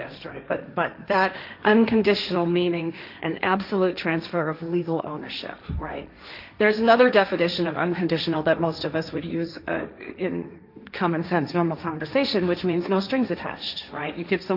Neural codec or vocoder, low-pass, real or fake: codec, 16 kHz, 1.1 kbps, Voila-Tokenizer; 5.4 kHz; fake